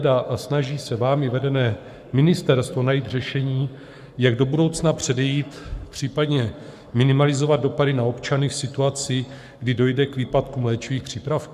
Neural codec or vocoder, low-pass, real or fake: codec, 44.1 kHz, 7.8 kbps, Pupu-Codec; 14.4 kHz; fake